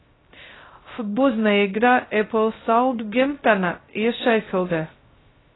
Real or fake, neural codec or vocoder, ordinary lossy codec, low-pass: fake; codec, 16 kHz, 0.2 kbps, FocalCodec; AAC, 16 kbps; 7.2 kHz